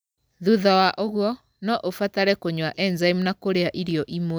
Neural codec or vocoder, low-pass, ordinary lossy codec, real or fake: none; none; none; real